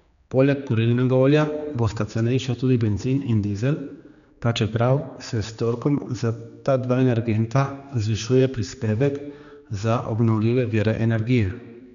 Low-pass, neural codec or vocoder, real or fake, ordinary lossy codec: 7.2 kHz; codec, 16 kHz, 2 kbps, X-Codec, HuBERT features, trained on general audio; fake; none